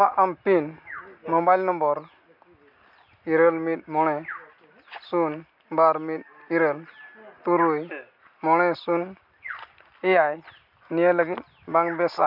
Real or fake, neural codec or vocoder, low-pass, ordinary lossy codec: real; none; 5.4 kHz; MP3, 48 kbps